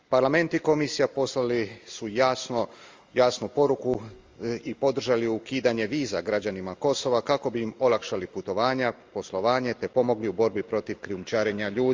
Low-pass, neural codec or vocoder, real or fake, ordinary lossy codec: 7.2 kHz; none; real; Opus, 32 kbps